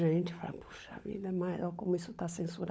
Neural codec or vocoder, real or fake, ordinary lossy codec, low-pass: codec, 16 kHz, 16 kbps, FunCodec, trained on LibriTTS, 50 frames a second; fake; none; none